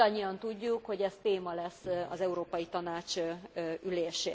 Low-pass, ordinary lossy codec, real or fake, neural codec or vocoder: none; none; real; none